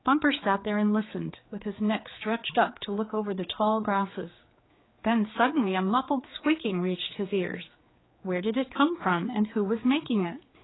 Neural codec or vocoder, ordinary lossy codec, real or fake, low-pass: codec, 16 kHz, 4 kbps, X-Codec, HuBERT features, trained on general audio; AAC, 16 kbps; fake; 7.2 kHz